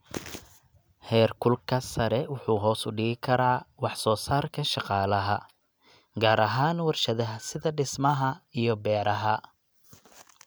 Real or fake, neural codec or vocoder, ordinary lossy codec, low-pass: real; none; none; none